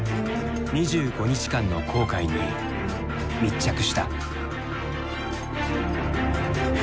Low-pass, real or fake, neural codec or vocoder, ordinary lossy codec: none; real; none; none